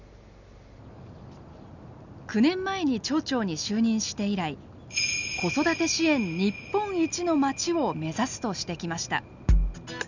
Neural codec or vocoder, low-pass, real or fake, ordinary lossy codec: none; 7.2 kHz; real; none